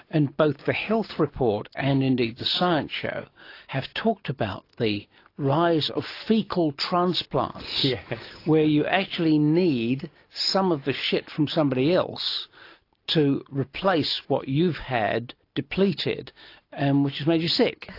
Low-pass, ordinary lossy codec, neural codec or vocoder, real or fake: 5.4 kHz; AAC, 32 kbps; none; real